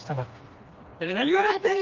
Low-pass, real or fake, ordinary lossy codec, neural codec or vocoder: 7.2 kHz; fake; Opus, 24 kbps; codec, 16 kHz, 2 kbps, FreqCodec, smaller model